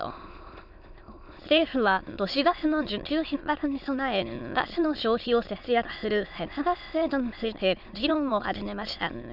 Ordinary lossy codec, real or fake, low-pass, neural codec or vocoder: none; fake; 5.4 kHz; autoencoder, 22.05 kHz, a latent of 192 numbers a frame, VITS, trained on many speakers